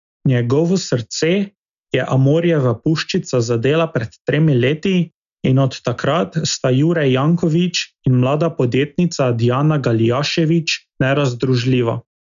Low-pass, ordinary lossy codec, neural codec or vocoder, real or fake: 7.2 kHz; none; none; real